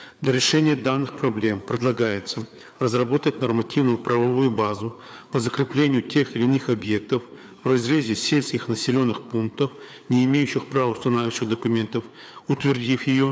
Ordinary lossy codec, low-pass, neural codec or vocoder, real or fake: none; none; codec, 16 kHz, 4 kbps, FreqCodec, larger model; fake